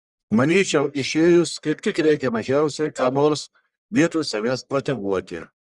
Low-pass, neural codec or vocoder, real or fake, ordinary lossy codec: 10.8 kHz; codec, 44.1 kHz, 1.7 kbps, Pupu-Codec; fake; Opus, 64 kbps